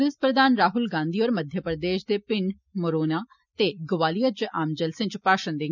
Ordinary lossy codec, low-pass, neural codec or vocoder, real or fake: none; 7.2 kHz; none; real